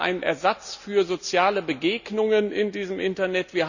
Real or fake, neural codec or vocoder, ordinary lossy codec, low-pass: real; none; none; 7.2 kHz